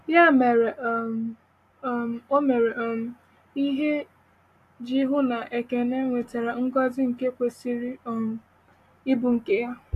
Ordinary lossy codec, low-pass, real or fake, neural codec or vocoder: AAC, 64 kbps; 14.4 kHz; real; none